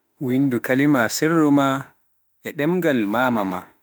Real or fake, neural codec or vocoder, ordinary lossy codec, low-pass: fake; autoencoder, 48 kHz, 32 numbers a frame, DAC-VAE, trained on Japanese speech; none; none